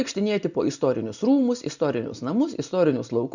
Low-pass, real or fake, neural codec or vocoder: 7.2 kHz; real; none